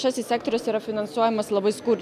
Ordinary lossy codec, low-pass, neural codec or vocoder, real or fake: MP3, 96 kbps; 14.4 kHz; none; real